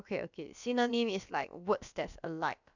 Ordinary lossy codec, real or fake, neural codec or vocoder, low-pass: none; fake; codec, 16 kHz, about 1 kbps, DyCAST, with the encoder's durations; 7.2 kHz